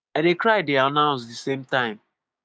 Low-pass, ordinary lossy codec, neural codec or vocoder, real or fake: none; none; codec, 16 kHz, 6 kbps, DAC; fake